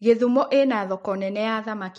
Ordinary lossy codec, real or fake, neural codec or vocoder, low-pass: MP3, 48 kbps; real; none; 19.8 kHz